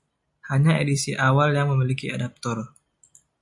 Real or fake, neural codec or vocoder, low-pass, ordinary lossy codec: real; none; 10.8 kHz; MP3, 64 kbps